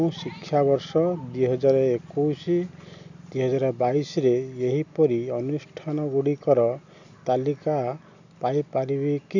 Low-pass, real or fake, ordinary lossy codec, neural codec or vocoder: 7.2 kHz; real; none; none